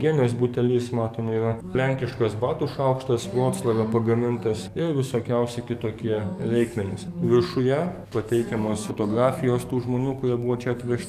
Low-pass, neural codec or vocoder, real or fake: 14.4 kHz; codec, 44.1 kHz, 7.8 kbps, DAC; fake